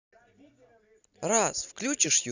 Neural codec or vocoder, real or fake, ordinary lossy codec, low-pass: none; real; none; 7.2 kHz